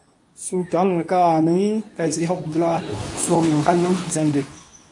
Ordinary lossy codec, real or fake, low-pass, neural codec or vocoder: AAC, 48 kbps; fake; 10.8 kHz; codec, 24 kHz, 0.9 kbps, WavTokenizer, medium speech release version 2